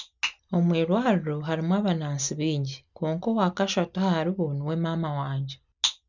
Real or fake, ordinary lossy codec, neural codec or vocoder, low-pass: real; none; none; 7.2 kHz